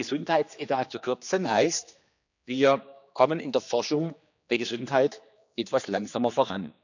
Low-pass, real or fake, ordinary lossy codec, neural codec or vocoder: 7.2 kHz; fake; none; codec, 16 kHz, 2 kbps, X-Codec, HuBERT features, trained on general audio